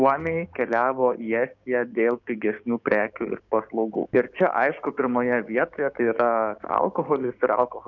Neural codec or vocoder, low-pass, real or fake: codec, 16 kHz, 6 kbps, DAC; 7.2 kHz; fake